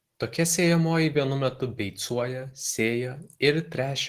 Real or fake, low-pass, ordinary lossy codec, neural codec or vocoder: real; 14.4 kHz; Opus, 16 kbps; none